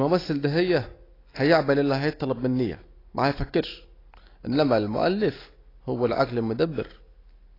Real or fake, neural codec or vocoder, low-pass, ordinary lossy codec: real; none; 5.4 kHz; AAC, 24 kbps